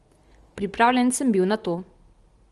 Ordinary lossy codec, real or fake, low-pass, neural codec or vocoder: Opus, 24 kbps; real; 10.8 kHz; none